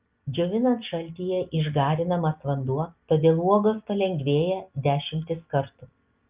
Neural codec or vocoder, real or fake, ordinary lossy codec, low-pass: none; real; Opus, 24 kbps; 3.6 kHz